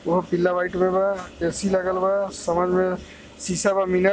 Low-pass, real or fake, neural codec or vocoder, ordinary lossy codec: none; real; none; none